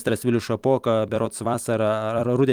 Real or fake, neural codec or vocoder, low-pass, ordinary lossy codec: fake; vocoder, 44.1 kHz, 128 mel bands every 256 samples, BigVGAN v2; 19.8 kHz; Opus, 32 kbps